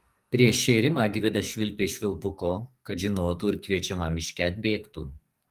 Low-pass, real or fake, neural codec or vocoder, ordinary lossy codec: 14.4 kHz; fake; codec, 44.1 kHz, 2.6 kbps, SNAC; Opus, 32 kbps